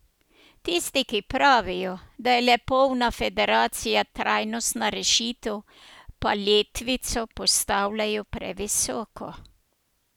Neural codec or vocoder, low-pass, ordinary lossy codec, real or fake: none; none; none; real